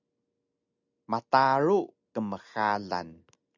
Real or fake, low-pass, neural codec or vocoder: real; 7.2 kHz; none